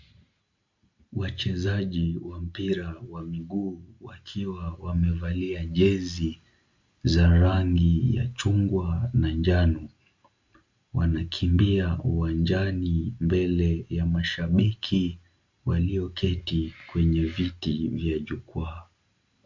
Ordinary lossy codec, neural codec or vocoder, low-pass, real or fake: MP3, 48 kbps; none; 7.2 kHz; real